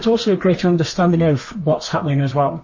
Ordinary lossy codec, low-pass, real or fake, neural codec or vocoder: MP3, 32 kbps; 7.2 kHz; fake; codec, 32 kHz, 1.9 kbps, SNAC